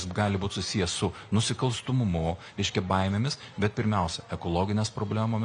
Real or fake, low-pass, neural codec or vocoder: real; 9.9 kHz; none